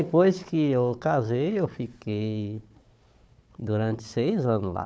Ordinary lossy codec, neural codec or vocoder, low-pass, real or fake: none; codec, 16 kHz, 4 kbps, FunCodec, trained on Chinese and English, 50 frames a second; none; fake